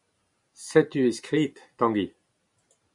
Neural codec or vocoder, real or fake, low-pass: none; real; 10.8 kHz